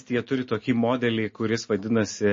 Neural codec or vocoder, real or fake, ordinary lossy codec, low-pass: none; real; MP3, 32 kbps; 7.2 kHz